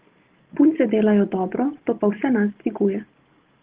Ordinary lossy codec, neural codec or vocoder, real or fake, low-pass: Opus, 16 kbps; none; real; 3.6 kHz